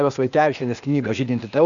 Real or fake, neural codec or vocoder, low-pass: fake; codec, 16 kHz, 0.8 kbps, ZipCodec; 7.2 kHz